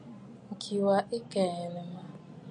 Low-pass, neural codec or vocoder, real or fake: 9.9 kHz; none; real